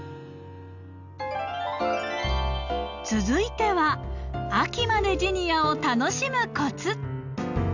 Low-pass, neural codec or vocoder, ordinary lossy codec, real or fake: 7.2 kHz; none; none; real